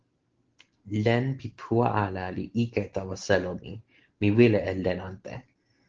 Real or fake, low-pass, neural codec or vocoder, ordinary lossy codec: real; 7.2 kHz; none; Opus, 16 kbps